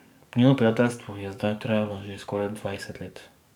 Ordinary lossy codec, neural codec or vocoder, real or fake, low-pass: none; codec, 44.1 kHz, 7.8 kbps, DAC; fake; 19.8 kHz